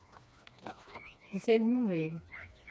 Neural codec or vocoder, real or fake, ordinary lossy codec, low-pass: codec, 16 kHz, 2 kbps, FreqCodec, smaller model; fake; none; none